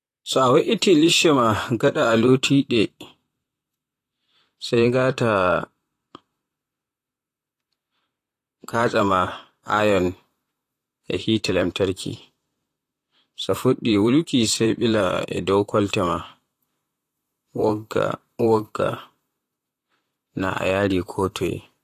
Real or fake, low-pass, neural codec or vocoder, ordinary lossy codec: fake; 14.4 kHz; vocoder, 44.1 kHz, 128 mel bands, Pupu-Vocoder; AAC, 48 kbps